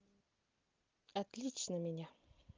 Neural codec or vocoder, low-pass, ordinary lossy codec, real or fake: none; 7.2 kHz; Opus, 24 kbps; real